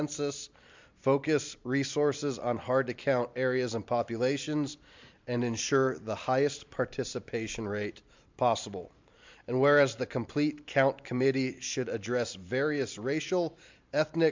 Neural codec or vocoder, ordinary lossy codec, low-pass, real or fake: vocoder, 44.1 kHz, 128 mel bands every 512 samples, BigVGAN v2; MP3, 64 kbps; 7.2 kHz; fake